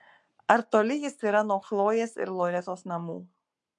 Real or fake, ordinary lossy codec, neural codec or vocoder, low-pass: fake; MP3, 64 kbps; codec, 44.1 kHz, 7.8 kbps, Pupu-Codec; 10.8 kHz